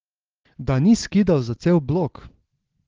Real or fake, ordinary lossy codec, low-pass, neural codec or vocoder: real; Opus, 16 kbps; 7.2 kHz; none